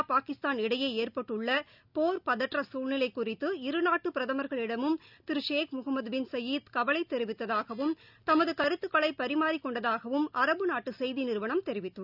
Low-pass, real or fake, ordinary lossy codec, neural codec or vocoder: 5.4 kHz; real; none; none